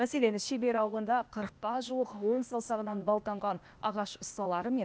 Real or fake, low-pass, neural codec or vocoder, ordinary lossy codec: fake; none; codec, 16 kHz, 0.8 kbps, ZipCodec; none